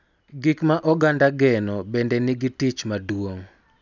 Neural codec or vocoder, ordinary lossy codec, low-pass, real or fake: none; none; 7.2 kHz; real